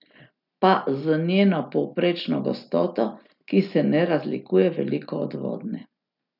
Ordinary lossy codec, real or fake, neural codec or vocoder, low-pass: none; real; none; 5.4 kHz